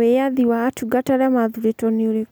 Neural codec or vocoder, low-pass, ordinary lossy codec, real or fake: none; none; none; real